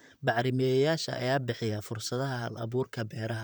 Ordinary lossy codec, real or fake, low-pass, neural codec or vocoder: none; fake; none; vocoder, 44.1 kHz, 128 mel bands, Pupu-Vocoder